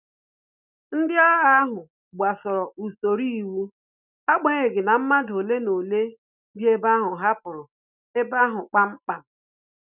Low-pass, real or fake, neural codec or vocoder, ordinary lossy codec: 3.6 kHz; real; none; none